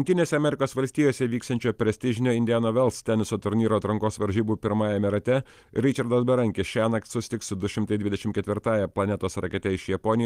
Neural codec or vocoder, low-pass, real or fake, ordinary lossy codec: none; 14.4 kHz; real; Opus, 24 kbps